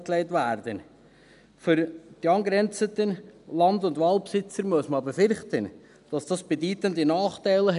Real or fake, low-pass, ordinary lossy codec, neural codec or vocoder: real; 10.8 kHz; none; none